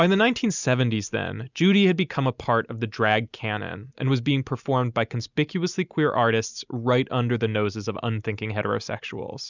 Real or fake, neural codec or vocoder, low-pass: real; none; 7.2 kHz